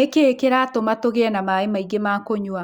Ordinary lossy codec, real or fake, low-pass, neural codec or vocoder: Opus, 64 kbps; real; 19.8 kHz; none